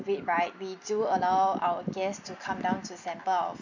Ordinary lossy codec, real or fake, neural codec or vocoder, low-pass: none; real; none; 7.2 kHz